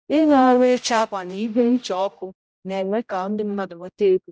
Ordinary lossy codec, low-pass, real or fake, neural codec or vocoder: none; none; fake; codec, 16 kHz, 0.5 kbps, X-Codec, HuBERT features, trained on general audio